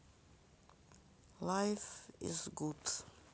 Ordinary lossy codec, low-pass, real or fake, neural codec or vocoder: none; none; real; none